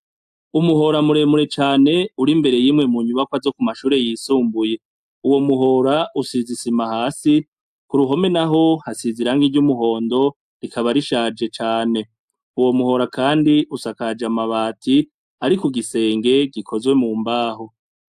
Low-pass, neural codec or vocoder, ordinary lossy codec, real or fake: 14.4 kHz; none; AAC, 96 kbps; real